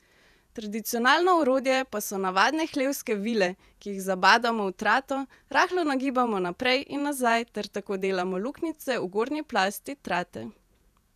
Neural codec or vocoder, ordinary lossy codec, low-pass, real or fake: vocoder, 48 kHz, 128 mel bands, Vocos; none; 14.4 kHz; fake